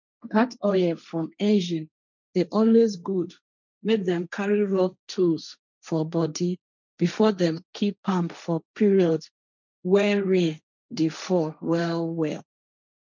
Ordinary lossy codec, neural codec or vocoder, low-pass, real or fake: none; codec, 16 kHz, 1.1 kbps, Voila-Tokenizer; 7.2 kHz; fake